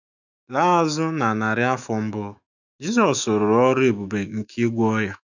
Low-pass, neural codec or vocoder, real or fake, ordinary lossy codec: 7.2 kHz; autoencoder, 48 kHz, 128 numbers a frame, DAC-VAE, trained on Japanese speech; fake; none